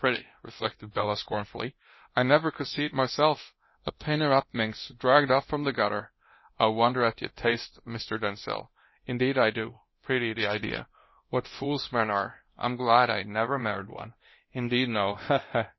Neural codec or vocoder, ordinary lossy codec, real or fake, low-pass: codec, 24 kHz, 0.9 kbps, DualCodec; MP3, 24 kbps; fake; 7.2 kHz